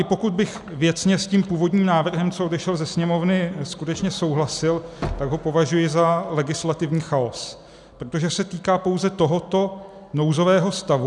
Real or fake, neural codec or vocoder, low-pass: real; none; 10.8 kHz